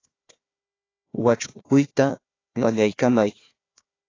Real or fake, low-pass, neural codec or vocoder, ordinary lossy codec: fake; 7.2 kHz; codec, 16 kHz, 1 kbps, FunCodec, trained on Chinese and English, 50 frames a second; AAC, 32 kbps